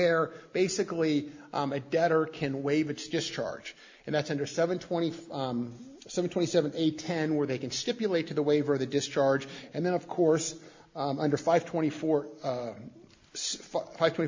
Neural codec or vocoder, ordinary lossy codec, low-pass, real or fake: none; MP3, 64 kbps; 7.2 kHz; real